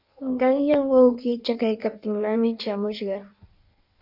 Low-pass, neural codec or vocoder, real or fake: 5.4 kHz; codec, 16 kHz in and 24 kHz out, 1.1 kbps, FireRedTTS-2 codec; fake